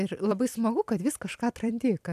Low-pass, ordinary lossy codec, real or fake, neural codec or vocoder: 14.4 kHz; AAC, 96 kbps; fake; vocoder, 44.1 kHz, 128 mel bands every 256 samples, BigVGAN v2